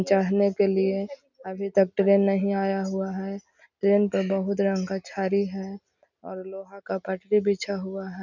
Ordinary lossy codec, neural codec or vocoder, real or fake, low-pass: none; none; real; 7.2 kHz